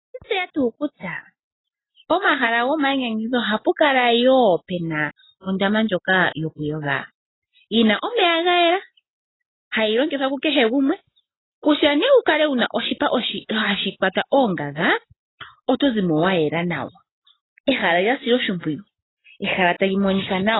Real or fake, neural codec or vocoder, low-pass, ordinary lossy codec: real; none; 7.2 kHz; AAC, 16 kbps